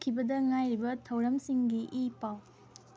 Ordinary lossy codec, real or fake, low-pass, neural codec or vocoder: none; real; none; none